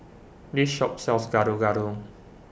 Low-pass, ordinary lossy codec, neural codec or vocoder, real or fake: none; none; none; real